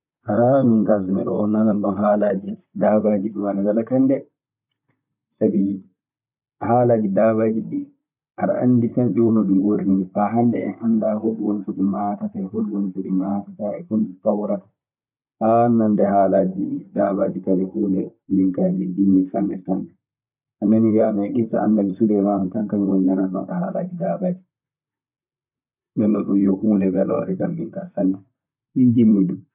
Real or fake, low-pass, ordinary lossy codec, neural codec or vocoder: fake; 3.6 kHz; none; vocoder, 44.1 kHz, 128 mel bands, Pupu-Vocoder